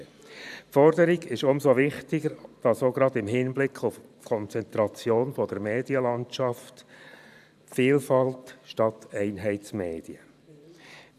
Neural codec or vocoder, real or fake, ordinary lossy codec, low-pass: vocoder, 44.1 kHz, 128 mel bands every 512 samples, BigVGAN v2; fake; none; 14.4 kHz